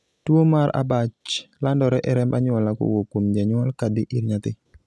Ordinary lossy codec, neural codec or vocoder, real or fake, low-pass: none; none; real; none